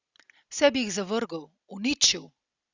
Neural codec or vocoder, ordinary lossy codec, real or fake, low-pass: none; Opus, 64 kbps; real; 7.2 kHz